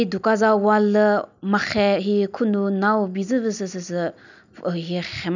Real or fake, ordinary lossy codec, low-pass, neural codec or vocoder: real; none; 7.2 kHz; none